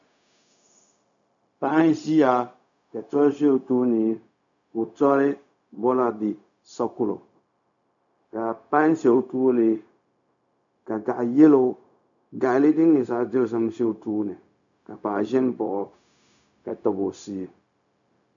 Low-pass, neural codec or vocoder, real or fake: 7.2 kHz; codec, 16 kHz, 0.4 kbps, LongCat-Audio-Codec; fake